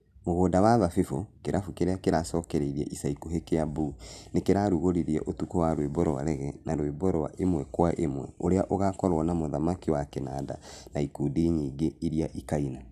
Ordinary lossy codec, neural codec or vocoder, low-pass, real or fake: none; none; 14.4 kHz; real